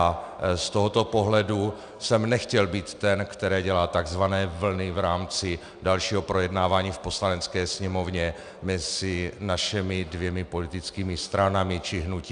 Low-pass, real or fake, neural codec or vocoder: 9.9 kHz; real; none